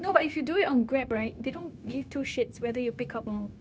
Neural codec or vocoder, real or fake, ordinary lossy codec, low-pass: codec, 16 kHz, 0.9 kbps, LongCat-Audio-Codec; fake; none; none